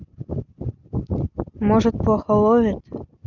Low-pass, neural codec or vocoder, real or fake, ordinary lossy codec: 7.2 kHz; none; real; none